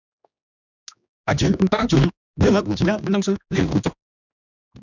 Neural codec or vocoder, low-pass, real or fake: codec, 16 kHz, 2 kbps, X-Codec, HuBERT features, trained on general audio; 7.2 kHz; fake